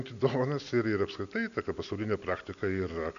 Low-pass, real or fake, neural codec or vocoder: 7.2 kHz; real; none